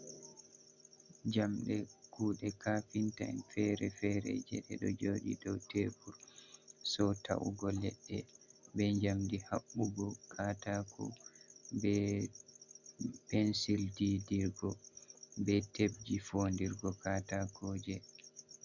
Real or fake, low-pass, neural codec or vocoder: real; 7.2 kHz; none